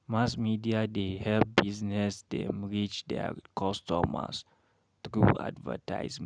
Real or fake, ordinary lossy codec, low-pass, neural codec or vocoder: real; none; 9.9 kHz; none